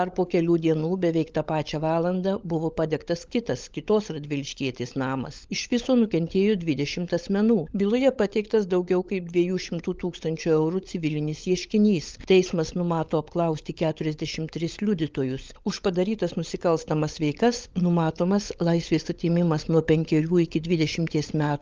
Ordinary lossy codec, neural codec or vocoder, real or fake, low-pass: Opus, 24 kbps; codec, 16 kHz, 16 kbps, FunCodec, trained on LibriTTS, 50 frames a second; fake; 7.2 kHz